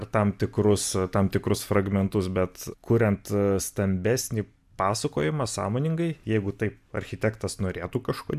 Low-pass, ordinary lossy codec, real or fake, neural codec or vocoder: 14.4 kHz; AAC, 96 kbps; real; none